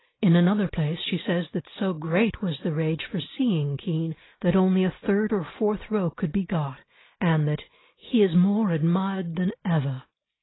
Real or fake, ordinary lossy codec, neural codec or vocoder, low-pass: real; AAC, 16 kbps; none; 7.2 kHz